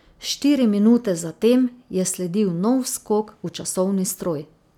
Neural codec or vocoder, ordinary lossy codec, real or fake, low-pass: none; none; real; 19.8 kHz